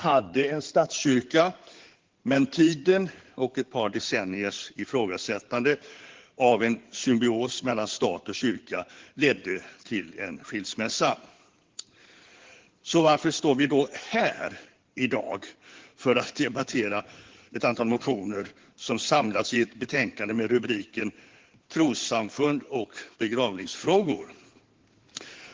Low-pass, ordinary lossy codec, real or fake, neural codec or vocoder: 7.2 kHz; Opus, 16 kbps; fake; codec, 16 kHz in and 24 kHz out, 2.2 kbps, FireRedTTS-2 codec